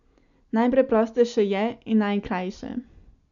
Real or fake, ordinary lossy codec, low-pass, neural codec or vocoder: real; none; 7.2 kHz; none